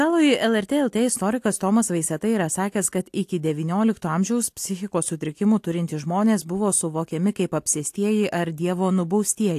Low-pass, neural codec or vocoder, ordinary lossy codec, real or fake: 14.4 kHz; none; AAC, 64 kbps; real